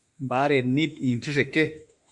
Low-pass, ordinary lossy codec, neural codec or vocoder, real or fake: 10.8 kHz; Opus, 64 kbps; autoencoder, 48 kHz, 32 numbers a frame, DAC-VAE, trained on Japanese speech; fake